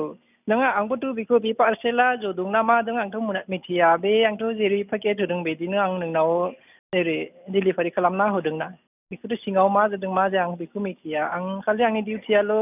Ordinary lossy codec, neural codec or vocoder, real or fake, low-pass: none; none; real; 3.6 kHz